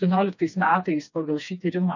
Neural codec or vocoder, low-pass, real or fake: codec, 16 kHz, 2 kbps, FreqCodec, smaller model; 7.2 kHz; fake